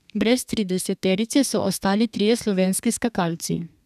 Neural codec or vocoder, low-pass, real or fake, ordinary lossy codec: codec, 32 kHz, 1.9 kbps, SNAC; 14.4 kHz; fake; none